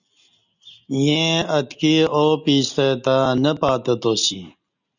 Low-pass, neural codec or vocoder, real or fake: 7.2 kHz; none; real